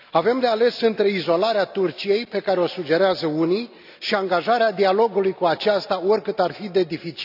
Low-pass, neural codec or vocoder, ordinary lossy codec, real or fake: 5.4 kHz; none; none; real